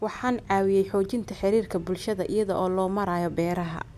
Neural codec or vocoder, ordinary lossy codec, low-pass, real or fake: none; none; 14.4 kHz; real